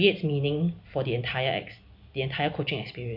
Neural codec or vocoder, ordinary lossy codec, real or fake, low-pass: none; none; real; 5.4 kHz